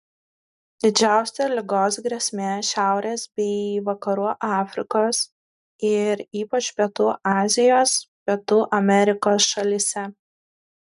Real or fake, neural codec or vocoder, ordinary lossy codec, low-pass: real; none; MP3, 96 kbps; 10.8 kHz